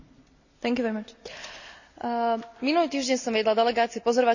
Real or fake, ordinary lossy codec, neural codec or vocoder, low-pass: real; none; none; 7.2 kHz